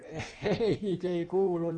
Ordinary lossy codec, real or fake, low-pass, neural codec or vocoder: none; fake; 9.9 kHz; codec, 16 kHz in and 24 kHz out, 1.1 kbps, FireRedTTS-2 codec